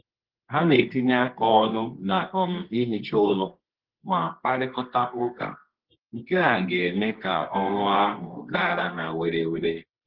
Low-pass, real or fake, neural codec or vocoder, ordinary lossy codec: 5.4 kHz; fake; codec, 24 kHz, 0.9 kbps, WavTokenizer, medium music audio release; Opus, 16 kbps